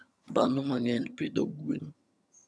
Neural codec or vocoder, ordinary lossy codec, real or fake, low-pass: vocoder, 22.05 kHz, 80 mel bands, HiFi-GAN; none; fake; none